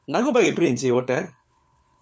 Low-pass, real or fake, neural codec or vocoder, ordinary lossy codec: none; fake; codec, 16 kHz, 4 kbps, FunCodec, trained on LibriTTS, 50 frames a second; none